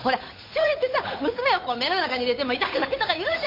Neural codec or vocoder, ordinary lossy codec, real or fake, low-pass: codec, 16 kHz, 8 kbps, FunCodec, trained on Chinese and English, 25 frames a second; MP3, 32 kbps; fake; 5.4 kHz